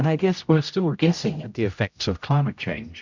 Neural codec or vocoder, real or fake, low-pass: codec, 16 kHz, 0.5 kbps, X-Codec, HuBERT features, trained on general audio; fake; 7.2 kHz